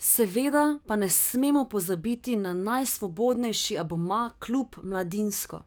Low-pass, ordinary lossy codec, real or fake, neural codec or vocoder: none; none; fake; codec, 44.1 kHz, 7.8 kbps, DAC